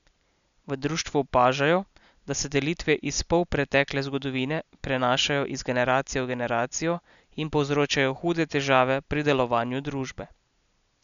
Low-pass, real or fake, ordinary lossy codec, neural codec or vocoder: 7.2 kHz; real; none; none